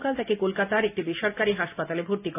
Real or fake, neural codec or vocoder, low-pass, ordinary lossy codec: real; none; 3.6 kHz; none